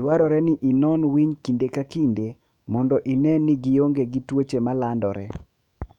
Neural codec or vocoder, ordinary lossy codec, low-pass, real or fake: autoencoder, 48 kHz, 128 numbers a frame, DAC-VAE, trained on Japanese speech; none; 19.8 kHz; fake